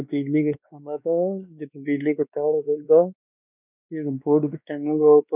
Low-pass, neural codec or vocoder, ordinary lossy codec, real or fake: 3.6 kHz; codec, 16 kHz, 2 kbps, X-Codec, WavLM features, trained on Multilingual LibriSpeech; none; fake